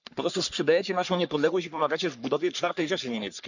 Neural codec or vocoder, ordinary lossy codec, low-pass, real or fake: codec, 44.1 kHz, 3.4 kbps, Pupu-Codec; none; 7.2 kHz; fake